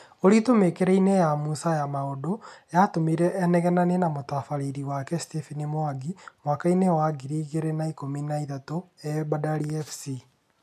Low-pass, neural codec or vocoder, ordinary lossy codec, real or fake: 14.4 kHz; none; none; real